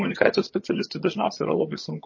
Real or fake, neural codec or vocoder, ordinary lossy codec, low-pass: fake; vocoder, 22.05 kHz, 80 mel bands, HiFi-GAN; MP3, 32 kbps; 7.2 kHz